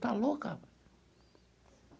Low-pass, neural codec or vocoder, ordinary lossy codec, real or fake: none; none; none; real